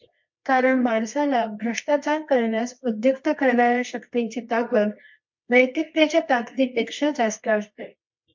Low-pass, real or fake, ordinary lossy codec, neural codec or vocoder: 7.2 kHz; fake; MP3, 48 kbps; codec, 24 kHz, 0.9 kbps, WavTokenizer, medium music audio release